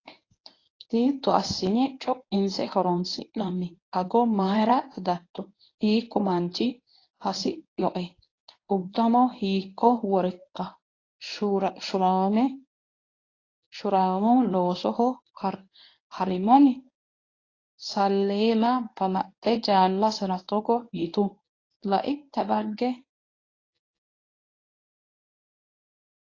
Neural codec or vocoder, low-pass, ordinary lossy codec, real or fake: codec, 24 kHz, 0.9 kbps, WavTokenizer, medium speech release version 1; 7.2 kHz; AAC, 32 kbps; fake